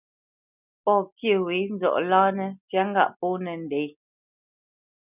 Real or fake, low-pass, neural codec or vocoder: real; 3.6 kHz; none